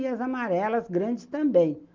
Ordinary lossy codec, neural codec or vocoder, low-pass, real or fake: Opus, 24 kbps; none; 7.2 kHz; real